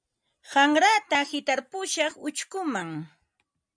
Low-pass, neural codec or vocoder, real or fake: 9.9 kHz; none; real